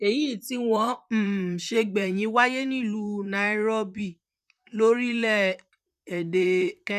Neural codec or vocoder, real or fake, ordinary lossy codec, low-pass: vocoder, 44.1 kHz, 128 mel bands, Pupu-Vocoder; fake; none; 14.4 kHz